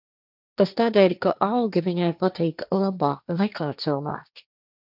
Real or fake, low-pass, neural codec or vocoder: fake; 5.4 kHz; codec, 16 kHz, 1.1 kbps, Voila-Tokenizer